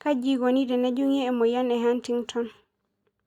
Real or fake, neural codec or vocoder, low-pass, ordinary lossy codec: real; none; 19.8 kHz; Opus, 64 kbps